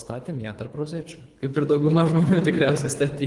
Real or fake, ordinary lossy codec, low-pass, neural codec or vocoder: fake; Opus, 24 kbps; 10.8 kHz; codec, 24 kHz, 3 kbps, HILCodec